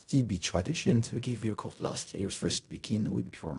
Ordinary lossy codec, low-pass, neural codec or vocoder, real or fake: none; 10.8 kHz; codec, 16 kHz in and 24 kHz out, 0.4 kbps, LongCat-Audio-Codec, fine tuned four codebook decoder; fake